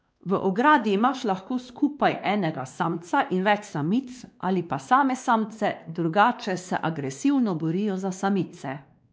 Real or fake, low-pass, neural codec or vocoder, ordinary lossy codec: fake; none; codec, 16 kHz, 2 kbps, X-Codec, WavLM features, trained on Multilingual LibriSpeech; none